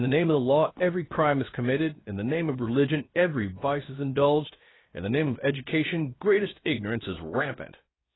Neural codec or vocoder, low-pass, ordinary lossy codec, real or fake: codec, 16 kHz, 0.7 kbps, FocalCodec; 7.2 kHz; AAC, 16 kbps; fake